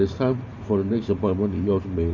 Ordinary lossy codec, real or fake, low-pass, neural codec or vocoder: none; fake; 7.2 kHz; vocoder, 44.1 kHz, 128 mel bands every 512 samples, BigVGAN v2